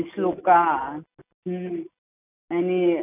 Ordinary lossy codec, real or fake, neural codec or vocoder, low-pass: none; real; none; 3.6 kHz